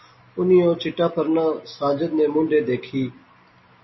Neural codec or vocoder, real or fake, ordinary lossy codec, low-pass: none; real; MP3, 24 kbps; 7.2 kHz